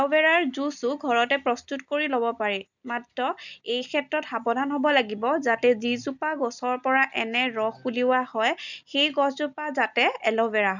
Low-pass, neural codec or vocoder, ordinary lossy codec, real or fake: 7.2 kHz; none; none; real